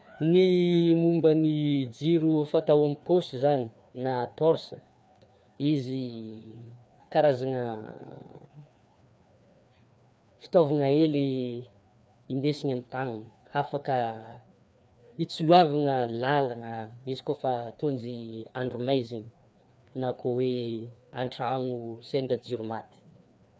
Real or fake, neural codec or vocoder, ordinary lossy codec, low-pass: fake; codec, 16 kHz, 2 kbps, FreqCodec, larger model; none; none